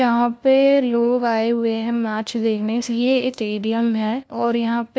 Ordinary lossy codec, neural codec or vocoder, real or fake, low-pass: none; codec, 16 kHz, 1 kbps, FunCodec, trained on LibriTTS, 50 frames a second; fake; none